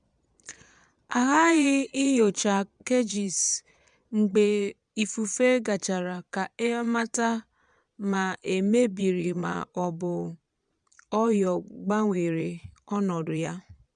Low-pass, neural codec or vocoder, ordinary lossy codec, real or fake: 9.9 kHz; vocoder, 22.05 kHz, 80 mel bands, Vocos; MP3, 96 kbps; fake